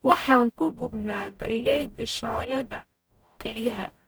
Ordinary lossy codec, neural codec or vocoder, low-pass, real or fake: none; codec, 44.1 kHz, 0.9 kbps, DAC; none; fake